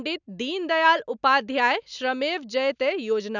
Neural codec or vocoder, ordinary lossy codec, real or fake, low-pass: none; none; real; 7.2 kHz